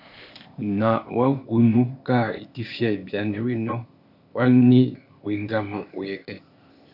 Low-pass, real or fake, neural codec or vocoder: 5.4 kHz; fake; codec, 16 kHz, 0.8 kbps, ZipCodec